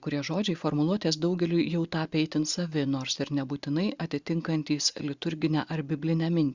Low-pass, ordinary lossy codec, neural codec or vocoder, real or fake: 7.2 kHz; Opus, 64 kbps; none; real